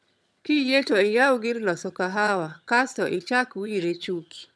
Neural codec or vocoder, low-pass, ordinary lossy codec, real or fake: vocoder, 22.05 kHz, 80 mel bands, HiFi-GAN; none; none; fake